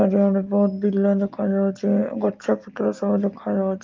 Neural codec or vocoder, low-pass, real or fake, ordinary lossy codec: none; 7.2 kHz; real; Opus, 24 kbps